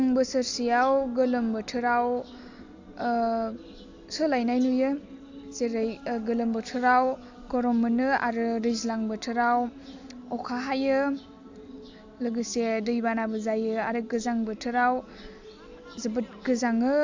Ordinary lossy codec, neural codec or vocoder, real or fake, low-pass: AAC, 48 kbps; none; real; 7.2 kHz